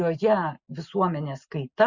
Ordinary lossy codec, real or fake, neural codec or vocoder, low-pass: Opus, 64 kbps; real; none; 7.2 kHz